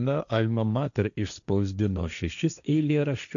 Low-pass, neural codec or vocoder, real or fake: 7.2 kHz; codec, 16 kHz, 1.1 kbps, Voila-Tokenizer; fake